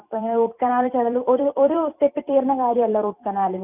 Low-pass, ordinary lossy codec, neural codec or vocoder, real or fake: 3.6 kHz; none; vocoder, 44.1 kHz, 128 mel bands every 256 samples, BigVGAN v2; fake